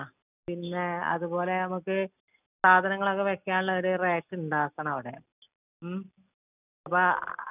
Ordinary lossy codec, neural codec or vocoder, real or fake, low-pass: none; none; real; 3.6 kHz